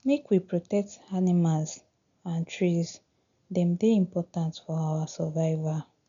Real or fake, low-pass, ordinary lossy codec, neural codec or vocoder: real; 7.2 kHz; MP3, 96 kbps; none